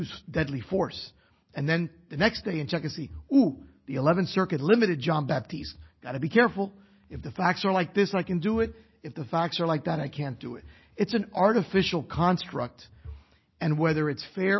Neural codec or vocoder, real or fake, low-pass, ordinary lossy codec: none; real; 7.2 kHz; MP3, 24 kbps